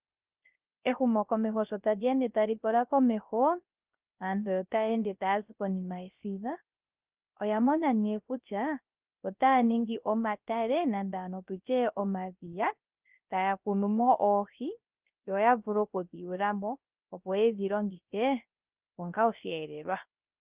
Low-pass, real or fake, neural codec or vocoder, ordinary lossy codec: 3.6 kHz; fake; codec, 16 kHz, 0.3 kbps, FocalCodec; Opus, 24 kbps